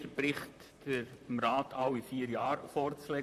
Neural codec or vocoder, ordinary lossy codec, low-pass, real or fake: vocoder, 44.1 kHz, 128 mel bands, Pupu-Vocoder; none; 14.4 kHz; fake